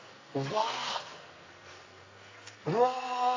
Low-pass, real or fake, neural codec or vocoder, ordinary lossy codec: 7.2 kHz; fake; codec, 44.1 kHz, 2.6 kbps, SNAC; AAC, 32 kbps